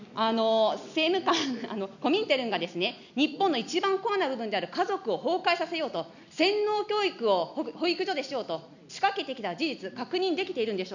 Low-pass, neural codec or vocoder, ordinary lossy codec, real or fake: 7.2 kHz; none; none; real